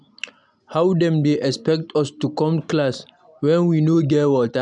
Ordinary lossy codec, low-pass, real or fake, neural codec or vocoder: none; 10.8 kHz; real; none